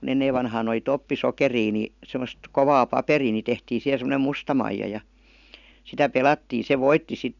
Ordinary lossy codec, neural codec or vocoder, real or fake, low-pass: none; none; real; 7.2 kHz